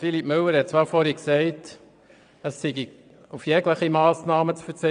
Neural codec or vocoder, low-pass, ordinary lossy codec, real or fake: vocoder, 22.05 kHz, 80 mel bands, WaveNeXt; 9.9 kHz; none; fake